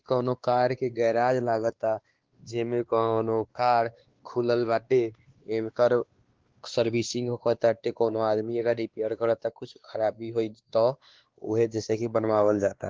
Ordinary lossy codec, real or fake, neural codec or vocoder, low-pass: Opus, 16 kbps; fake; codec, 16 kHz, 2 kbps, X-Codec, WavLM features, trained on Multilingual LibriSpeech; 7.2 kHz